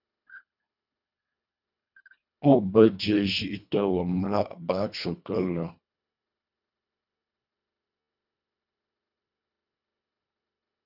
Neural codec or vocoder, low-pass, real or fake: codec, 24 kHz, 1.5 kbps, HILCodec; 5.4 kHz; fake